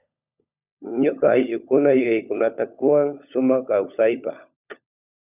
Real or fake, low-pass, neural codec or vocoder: fake; 3.6 kHz; codec, 16 kHz, 16 kbps, FunCodec, trained on LibriTTS, 50 frames a second